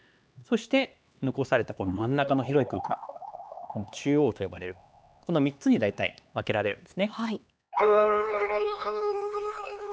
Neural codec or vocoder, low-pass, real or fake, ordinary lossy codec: codec, 16 kHz, 2 kbps, X-Codec, HuBERT features, trained on LibriSpeech; none; fake; none